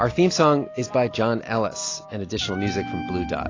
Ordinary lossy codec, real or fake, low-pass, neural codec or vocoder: AAC, 32 kbps; real; 7.2 kHz; none